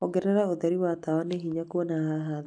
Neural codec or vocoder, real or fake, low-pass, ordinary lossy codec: none; real; 9.9 kHz; none